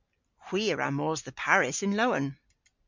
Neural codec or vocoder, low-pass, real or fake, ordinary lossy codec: none; 7.2 kHz; real; MP3, 64 kbps